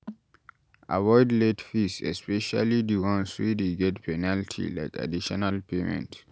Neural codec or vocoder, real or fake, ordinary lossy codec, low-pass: none; real; none; none